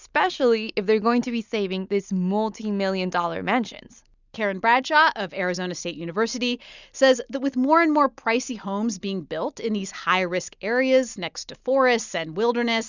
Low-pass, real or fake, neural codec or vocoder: 7.2 kHz; real; none